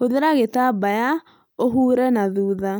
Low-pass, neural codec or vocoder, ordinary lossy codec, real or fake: none; none; none; real